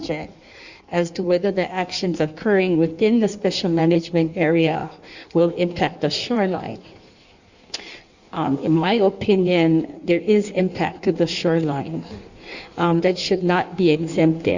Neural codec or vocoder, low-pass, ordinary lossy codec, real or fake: codec, 16 kHz in and 24 kHz out, 1.1 kbps, FireRedTTS-2 codec; 7.2 kHz; Opus, 64 kbps; fake